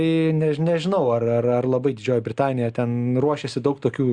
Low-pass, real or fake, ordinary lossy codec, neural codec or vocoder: 9.9 kHz; real; Opus, 64 kbps; none